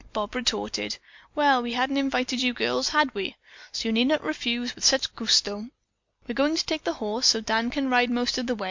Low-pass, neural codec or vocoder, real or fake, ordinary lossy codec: 7.2 kHz; none; real; MP3, 48 kbps